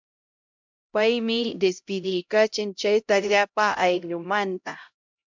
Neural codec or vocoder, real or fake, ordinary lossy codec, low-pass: codec, 16 kHz, 0.5 kbps, X-Codec, HuBERT features, trained on LibriSpeech; fake; MP3, 64 kbps; 7.2 kHz